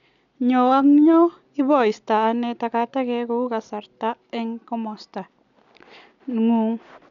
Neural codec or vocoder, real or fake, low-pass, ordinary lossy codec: none; real; 7.2 kHz; none